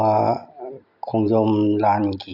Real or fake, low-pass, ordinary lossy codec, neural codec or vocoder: real; 5.4 kHz; none; none